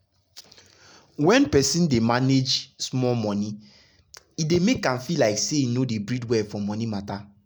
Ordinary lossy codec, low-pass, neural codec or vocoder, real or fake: none; none; none; real